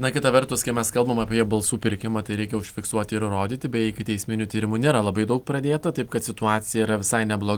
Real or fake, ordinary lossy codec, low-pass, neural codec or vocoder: real; Opus, 24 kbps; 19.8 kHz; none